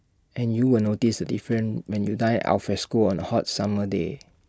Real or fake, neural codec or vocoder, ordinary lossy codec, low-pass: real; none; none; none